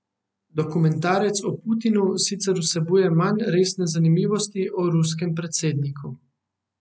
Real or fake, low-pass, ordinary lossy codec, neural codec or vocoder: real; none; none; none